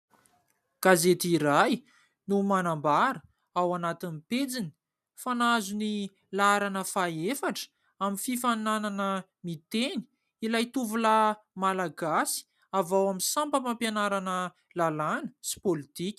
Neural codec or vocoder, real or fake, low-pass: none; real; 14.4 kHz